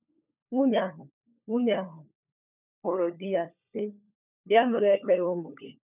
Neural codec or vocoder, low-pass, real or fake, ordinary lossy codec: codec, 16 kHz, 4 kbps, FunCodec, trained on LibriTTS, 50 frames a second; 3.6 kHz; fake; none